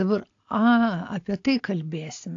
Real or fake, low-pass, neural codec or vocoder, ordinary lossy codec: real; 7.2 kHz; none; AAC, 48 kbps